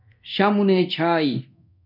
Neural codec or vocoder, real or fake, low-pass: codec, 24 kHz, 0.9 kbps, DualCodec; fake; 5.4 kHz